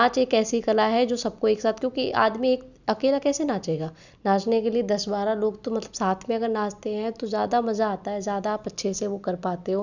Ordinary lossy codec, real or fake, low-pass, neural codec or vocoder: none; real; 7.2 kHz; none